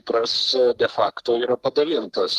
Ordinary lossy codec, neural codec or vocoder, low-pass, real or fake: Opus, 24 kbps; codec, 44.1 kHz, 3.4 kbps, Pupu-Codec; 14.4 kHz; fake